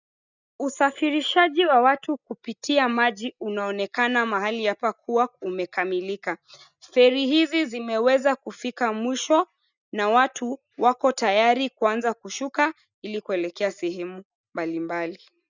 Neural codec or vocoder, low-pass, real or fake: none; 7.2 kHz; real